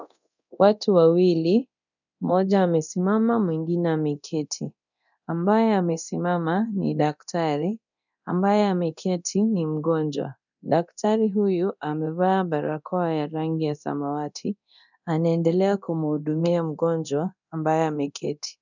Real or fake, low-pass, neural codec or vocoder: fake; 7.2 kHz; codec, 24 kHz, 0.9 kbps, DualCodec